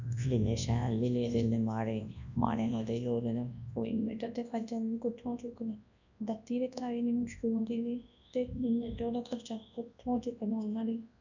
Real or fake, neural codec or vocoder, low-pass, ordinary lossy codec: fake; codec, 24 kHz, 0.9 kbps, WavTokenizer, large speech release; 7.2 kHz; none